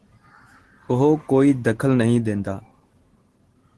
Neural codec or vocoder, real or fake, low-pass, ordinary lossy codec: none; real; 10.8 kHz; Opus, 16 kbps